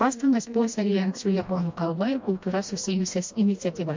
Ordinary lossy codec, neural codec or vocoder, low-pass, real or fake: MP3, 48 kbps; codec, 16 kHz, 1 kbps, FreqCodec, smaller model; 7.2 kHz; fake